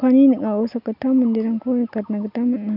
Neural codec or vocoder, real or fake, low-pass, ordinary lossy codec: none; real; 5.4 kHz; none